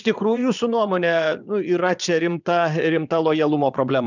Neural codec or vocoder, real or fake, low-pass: vocoder, 22.05 kHz, 80 mel bands, WaveNeXt; fake; 7.2 kHz